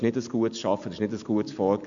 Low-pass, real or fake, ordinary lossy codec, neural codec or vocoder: 7.2 kHz; real; AAC, 64 kbps; none